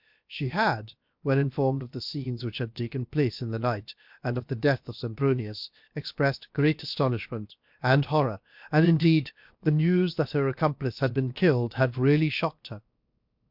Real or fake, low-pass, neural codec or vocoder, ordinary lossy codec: fake; 5.4 kHz; codec, 16 kHz, 0.7 kbps, FocalCodec; MP3, 48 kbps